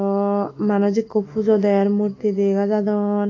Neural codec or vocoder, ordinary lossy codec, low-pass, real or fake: autoencoder, 48 kHz, 128 numbers a frame, DAC-VAE, trained on Japanese speech; AAC, 32 kbps; 7.2 kHz; fake